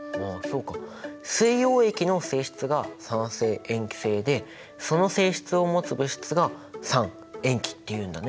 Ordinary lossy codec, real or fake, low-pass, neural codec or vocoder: none; real; none; none